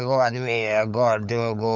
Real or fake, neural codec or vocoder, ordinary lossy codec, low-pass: fake; codec, 16 kHz, 8 kbps, FunCodec, trained on LibriTTS, 25 frames a second; none; 7.2 kHz